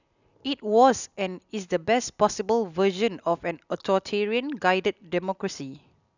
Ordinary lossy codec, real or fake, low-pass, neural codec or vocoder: none; real; 7.2 kHz; none